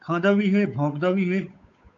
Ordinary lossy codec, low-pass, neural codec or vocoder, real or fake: AAC, 48 kbps; 7.2 kHz; codec, 16 kHz, 8 kbps, FunCodec, trained on Chinese and English, 25 frames a second; fake